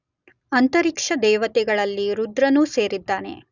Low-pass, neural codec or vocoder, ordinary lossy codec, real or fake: 7.2 kHz; none; none; real